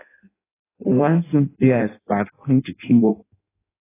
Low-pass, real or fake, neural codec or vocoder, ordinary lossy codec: 3.6 kHz; fake; codec, 16 kHz in and 24 kHz out, 0.6 kbps, FireRedTTS-2 codec; AAC, 16 kbps